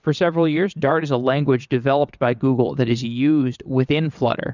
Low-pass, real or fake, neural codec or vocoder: 7.2 kHz; fake; vocoder, 22.05 kHz, 80 mel bands, WaveNeXt